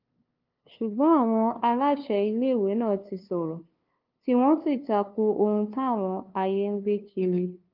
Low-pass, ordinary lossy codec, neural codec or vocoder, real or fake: 5.4 kHz; Opus, 24 kbps; codec, 16 kHz, 2 kbps, FunCodec, trained on LibriTTS, 25 frames a second; fake